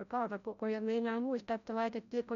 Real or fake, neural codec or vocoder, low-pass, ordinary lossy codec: fake; codec, 16 kHz, 0.5 kbps, FreqCodec, larger model; 7.2 kHz; MP3, 96 kbps